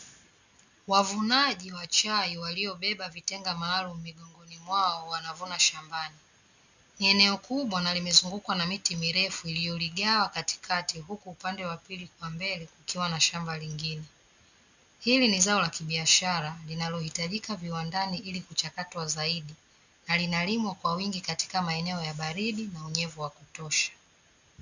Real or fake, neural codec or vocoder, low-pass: real; none; 7.2 kHz